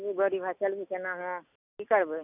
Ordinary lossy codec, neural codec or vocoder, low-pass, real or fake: none; none; 3.6 kHz; real